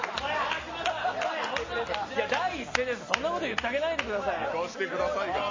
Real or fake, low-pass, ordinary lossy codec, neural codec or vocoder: real; 7.2 kHz; MP3, 32 kbps; none